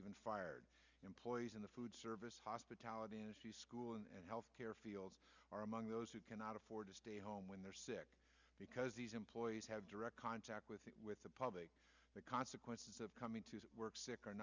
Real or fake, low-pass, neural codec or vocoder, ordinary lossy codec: real; 7.2 kHz; none; Opus, 64 kbps